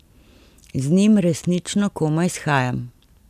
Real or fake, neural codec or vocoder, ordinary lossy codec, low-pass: real; none; none; 14.4 kHz